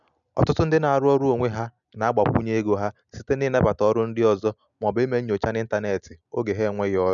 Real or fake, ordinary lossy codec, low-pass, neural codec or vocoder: real; none; 7.2 kHz; none